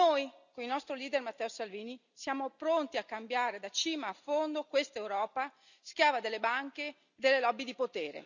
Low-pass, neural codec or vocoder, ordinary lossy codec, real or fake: 7.2 kHz; none; none; real